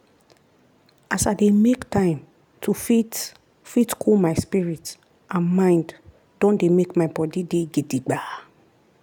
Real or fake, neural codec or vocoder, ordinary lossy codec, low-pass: real; none; none; 19.8 kHz